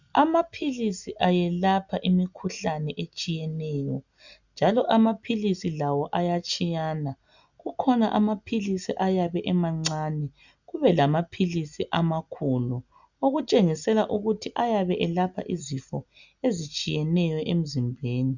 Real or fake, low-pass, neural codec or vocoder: real; 7.2 kHz; none